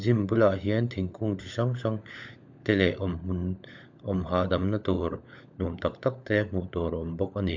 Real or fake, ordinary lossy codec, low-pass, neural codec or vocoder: fake; MP3, 64 kbps; 7.2 kHz; codec, 16 kHz, 16 kbps, FunCodec, trained on LibriTTS, 50 frames a second